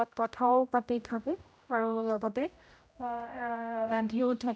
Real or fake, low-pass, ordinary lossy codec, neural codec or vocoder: fake; none; none; codec, 16 kHz, 0.5 kbps, X-Codec, HuBERT features, trained on general audio